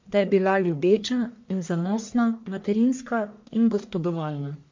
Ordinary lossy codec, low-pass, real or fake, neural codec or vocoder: MP3, 48 kbps; 7.2 kHz; fake; codec, 44.1 kHz, 1.7 kbps, Pupu-Codec